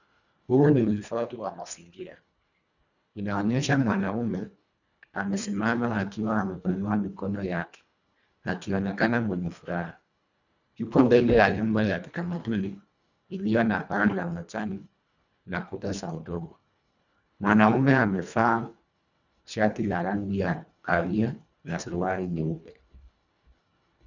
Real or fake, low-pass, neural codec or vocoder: fake; 7.2 kHz; codec, 24 kHz, 1.5 kbps, HILCodec